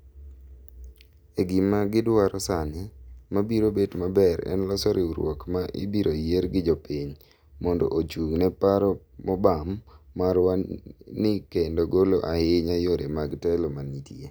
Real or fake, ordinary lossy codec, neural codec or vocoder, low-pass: real; none; none; none